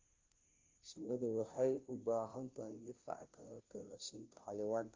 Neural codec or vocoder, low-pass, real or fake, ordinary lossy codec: codec, 16 kHz, 0.5 kbps, FunCodec, trained on Chinese and English, 25 frames a second; 7.2 kHz; fake; Opus, 24 kbps